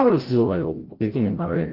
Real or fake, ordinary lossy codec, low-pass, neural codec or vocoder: fake; Opus, 16 kbps; 5.4 kHz; codec, 16 kHz, 0.5 kbps, FreqCodec, larger model